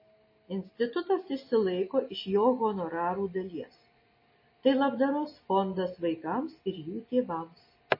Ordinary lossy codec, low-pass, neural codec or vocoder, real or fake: MP3, 24 kbps; 5.4 kHz; none; real